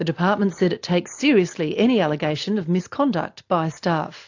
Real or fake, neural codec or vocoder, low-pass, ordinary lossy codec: real; none; 7.2 kHz; AAC, 48 kbps